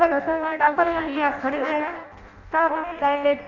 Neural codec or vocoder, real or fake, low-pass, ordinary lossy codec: codec, 16 kHz in and 24 kHz out, 0.6 kbps, FireRedTTS-2 codec; fake; 7.2 kHz; none